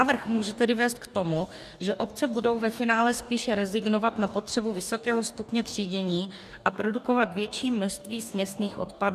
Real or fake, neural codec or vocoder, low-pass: fake; codec, 44.1 kHz, 2.6 kbps, DAC; 14.4 kHz